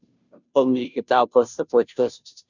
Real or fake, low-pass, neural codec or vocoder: fake; 7.2 kHz; codec, 16 kHz, 0.5 kbps, FunCodec, trained on Chinese and English, 25 frames a second